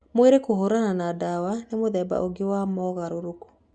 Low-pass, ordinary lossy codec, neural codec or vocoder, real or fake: 9.9 kHz; Opus, 64 kbps; none; real